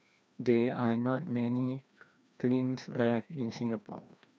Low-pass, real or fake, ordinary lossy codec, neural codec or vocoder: none; fake; none; codec, 16 kHz, 1 kbps, FreqCodec, larger model